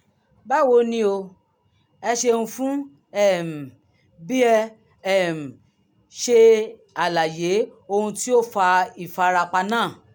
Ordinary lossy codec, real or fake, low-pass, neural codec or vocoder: none; real; none; none